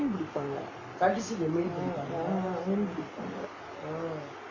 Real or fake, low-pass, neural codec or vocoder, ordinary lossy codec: real; 7.2 kHz; none; none